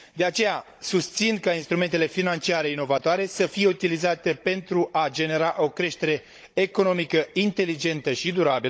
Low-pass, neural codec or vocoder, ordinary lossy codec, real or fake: none; codec, 16 kHz, 16 kbps, FunCodec, trained on Chinese and English, 50 frames a second; none; fake